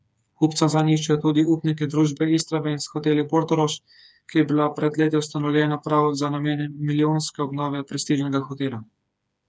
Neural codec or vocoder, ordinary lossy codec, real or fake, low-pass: codec, 16 kHz, 4 kbps, FreqCodec, smaller model; none; fake; none